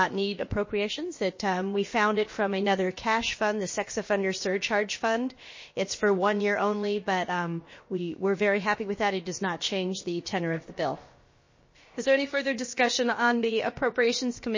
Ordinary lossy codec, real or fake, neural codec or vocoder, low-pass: MP3, 32 kbps; fake; codec, 16 kHz, about 1 kbps, DyCAST, with the encoder's durations; 7.2 kHz